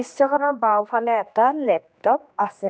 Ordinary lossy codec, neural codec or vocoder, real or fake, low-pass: none; codec, 16 kHz, 2 kbps, X-Codec, HuBERT features, trained on general audio; fake; none